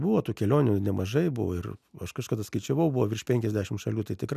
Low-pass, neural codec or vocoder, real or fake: 14.4 kHz; none; real